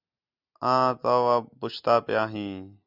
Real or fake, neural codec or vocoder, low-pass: real; none; 5.4 kHz